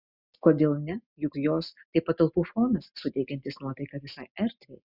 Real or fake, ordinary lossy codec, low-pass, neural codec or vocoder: real; Opus, 64 kbps; 5.4 kHz; none